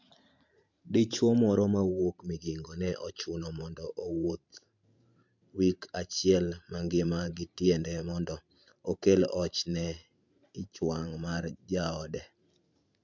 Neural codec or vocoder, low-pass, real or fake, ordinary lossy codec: vocoder, 44.1 kHz, 128 mel bands every 512 samples, BigVGAN v2; 7.2 kHz; fake; MP3, 64 kbps